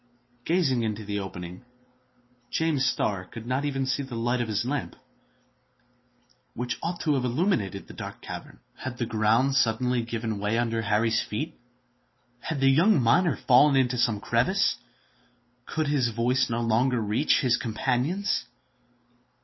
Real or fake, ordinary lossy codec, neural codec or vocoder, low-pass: real; MP3, 24 kbps; none; 7.2 kHz